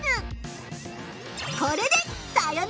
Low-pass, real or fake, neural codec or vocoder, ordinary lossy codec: none; real; none; none